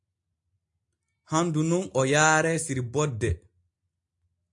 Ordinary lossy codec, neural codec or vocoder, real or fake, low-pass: AAC, 64 kbps; none; real; 10.8 kHz